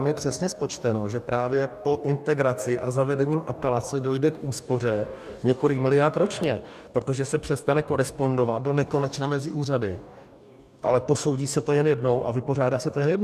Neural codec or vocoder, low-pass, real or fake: codec, 44.1 kHz, 2.6 kbps, DAC; 14.4 kHz; fake